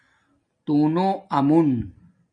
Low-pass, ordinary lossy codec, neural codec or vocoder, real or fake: 9.9 kHz; MP3, 64 kbps; none; real